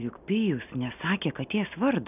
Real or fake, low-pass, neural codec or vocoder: real; 3.6 kHz; none